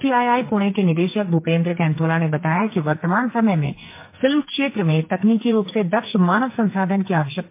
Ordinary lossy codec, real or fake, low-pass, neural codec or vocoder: MP3, 24 kbps; fake; 3.6 kHz; codec, 44.1 kHz, 2.6 kbps, SNAC